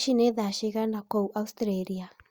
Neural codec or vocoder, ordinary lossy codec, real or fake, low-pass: none; Opus, 64 kbps; real; 19.8 kHz